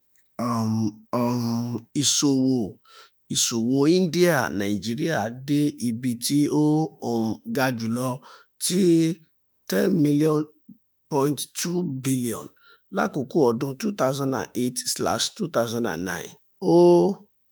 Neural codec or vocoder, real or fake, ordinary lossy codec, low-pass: autoencoder, 48 kHz, 32 numbers a frame, DAC-VAE, trained on Japanese speech; fake; none; none